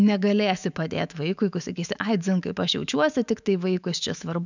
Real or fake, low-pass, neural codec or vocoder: fake; 7.2 kHz; autoencoder, 48 kHz, 128 numbers a frame, DAC-VAE, trained on Japanese speech